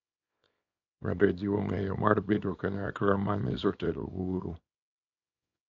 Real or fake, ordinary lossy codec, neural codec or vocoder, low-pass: fake; AAC, 48 kbps; codec, 24 kHz, 0.9 kbps, WavTokenizer, small release; 7.2 kHz